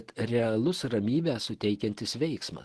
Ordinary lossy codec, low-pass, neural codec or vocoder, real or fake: Opus, 16 kbps; 10.8 kHz; none; real